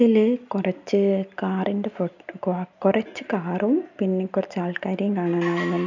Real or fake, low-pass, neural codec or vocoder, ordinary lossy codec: real; 7.2 kHz; none; none